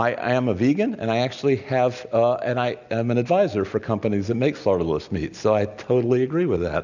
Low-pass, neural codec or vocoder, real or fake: 7.2 kHz; none; real